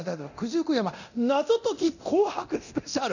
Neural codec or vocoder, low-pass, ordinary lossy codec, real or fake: codec, 24 kHz, 0.9 kbps, DualCodec; 7.2 kHz; none; fake